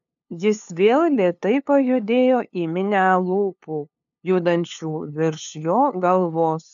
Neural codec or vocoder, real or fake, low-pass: codec, 16 kHz, 2 kbps, FunCodec, trained on LibriTTS, 25 frames a second; fake; 7.2 kHz